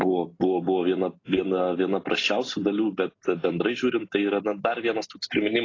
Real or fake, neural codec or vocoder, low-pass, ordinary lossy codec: real; none; 7.2 kHz; AAC, 32 kbps